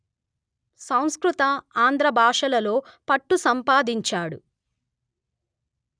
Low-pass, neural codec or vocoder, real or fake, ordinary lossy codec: 9.9 kHz; none; real; none